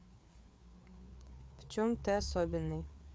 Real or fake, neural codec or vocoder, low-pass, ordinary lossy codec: fake; codec, 16 kHz, 4 kbps, FreqCodec, larger model; none; none